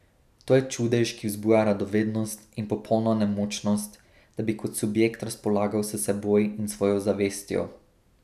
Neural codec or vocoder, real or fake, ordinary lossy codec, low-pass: none; real; none; 14.4 kHz